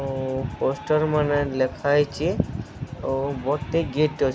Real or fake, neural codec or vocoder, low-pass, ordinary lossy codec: real; none; none; none